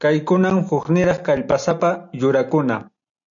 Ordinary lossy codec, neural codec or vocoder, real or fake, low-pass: MP3, 64 kbps; none; real; 7.2 kHz